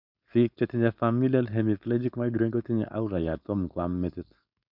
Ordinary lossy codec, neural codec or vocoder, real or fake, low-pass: none; codec, 16 kHz, 4.8 kbps, FACodec; fake; 5.4 kHz